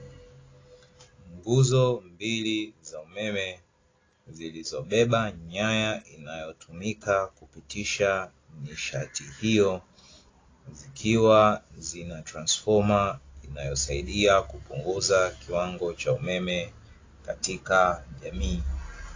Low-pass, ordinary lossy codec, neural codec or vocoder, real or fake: 7.2 kHz; AAC, 32 kbps; none; real